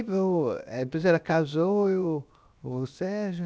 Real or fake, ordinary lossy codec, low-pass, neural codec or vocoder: fake; none; none; codec, 16 kHz, 0.7 kbps, FocalCodec